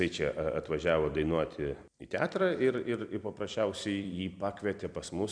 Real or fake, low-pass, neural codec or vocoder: fake; 9.9 kHz; vocoder, 44.1 kHz, 128 mel bands every 256 samples, BigVGAN v2